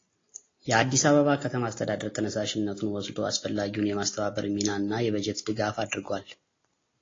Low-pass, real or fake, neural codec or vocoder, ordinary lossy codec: 7.2 kHz; real; none; AAC, 32 kbps